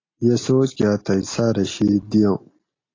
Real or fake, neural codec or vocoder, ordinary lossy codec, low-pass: real; none; AAC, 32 kbps; 7.2 kHz